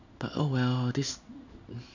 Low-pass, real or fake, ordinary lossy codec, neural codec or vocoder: 7.2 kHz; fake; none; codec, 16 kHz in and 24 kHz out, 1 kbps, XY-Tokenizer